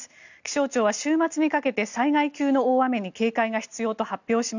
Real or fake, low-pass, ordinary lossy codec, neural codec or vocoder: real; 7.2 kHz; none; none